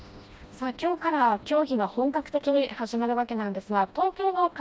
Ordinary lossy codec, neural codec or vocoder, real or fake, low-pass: none; codec, 16 kHz, 1 kbps, FreqCodec, smaller model; fake; none